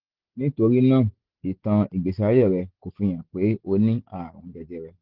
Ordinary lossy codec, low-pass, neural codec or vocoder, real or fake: Opus, 16 kbps; 5.4 kHz; none; real